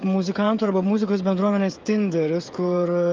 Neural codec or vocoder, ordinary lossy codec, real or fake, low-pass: codec, 16 kHz, 16 kbps, FreqCodec, smaller model; Opus, 24 kbps; fake; 7.2 kHz